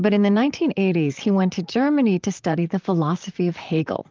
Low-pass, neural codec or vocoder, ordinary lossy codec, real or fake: 7.2 kHz; vocoder, 44.1 kHz, 128 mel bands, Pupu-Vocoder; Opus, 24 kbps; fake